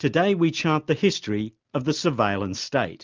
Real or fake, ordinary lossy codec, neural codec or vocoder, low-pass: real; Opus, 24 kbps; none; 7.2 kHz